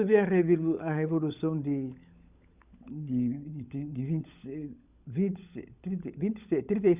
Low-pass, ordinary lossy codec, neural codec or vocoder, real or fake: 3.6 kHz; Opus, 64 kbps; codec, 16 kHz, 16 kbps, FunCodec, trained on LibriTTS, 50 frames a second; fake